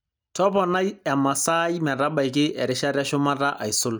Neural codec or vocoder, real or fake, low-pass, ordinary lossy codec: none; real; none; none